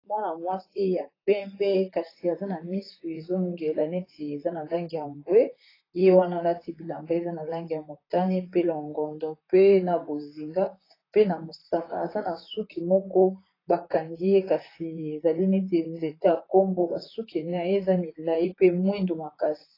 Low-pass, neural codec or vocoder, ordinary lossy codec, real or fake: 5.4 kHz; vocoder, 44.1 kHz, 128 mel bands, Pupu-Vocoder; AAC, 24 kbps; fake